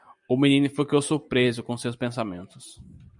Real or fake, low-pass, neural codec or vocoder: fake; 10.8 kHz; vocoder, 44.1 kHz, 128 mel bands every 256 samples, BigVGAN v2